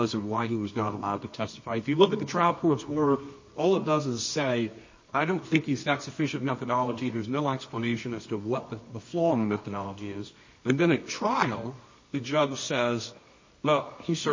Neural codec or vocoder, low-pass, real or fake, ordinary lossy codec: codec, 24 kHz, 0.9 kbps, WavTokenizer, medium music audio release; 7.2 kHz; fake; MP3, 32 kbps